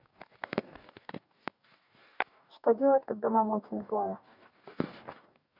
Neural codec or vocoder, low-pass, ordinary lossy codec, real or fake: codec, 44.1 kHz, 2.6 kbps, DAC; 5.4 kHz; none; fake